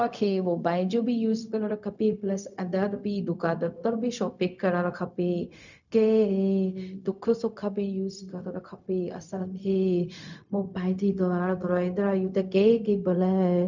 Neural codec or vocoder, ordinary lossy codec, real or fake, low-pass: codec, 16 kHz, 0.4 kbps, LongCat-Audio-Codec; none; fake; 7.2 kHz